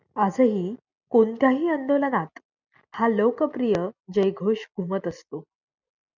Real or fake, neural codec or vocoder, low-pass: real; none; 7.2 kHz